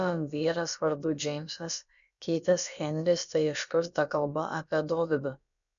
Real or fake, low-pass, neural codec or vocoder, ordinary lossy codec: fake; 7.2 kHz; codec, 16 kHz, about 1 kbps, DyCAST, with the encoder's durations; MP3, 96 kbps